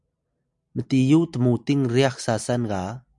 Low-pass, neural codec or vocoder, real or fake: 10.8 kHz; none; real